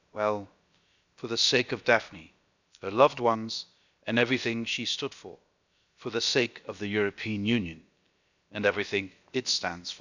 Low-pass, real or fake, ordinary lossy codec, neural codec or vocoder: 7.2 kHz; fake; none; codec, 16 kHz, about 1 kbps, DyCAST, with the encoder's durations